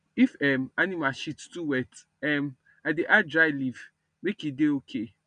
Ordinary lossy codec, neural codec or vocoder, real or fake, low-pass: none; none; real; 9.9 kHz